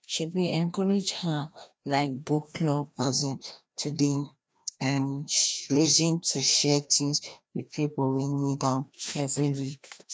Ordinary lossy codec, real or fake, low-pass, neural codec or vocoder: none; fake; none; codec, 16 kHz, 1 kbps, FreqCodec, larger model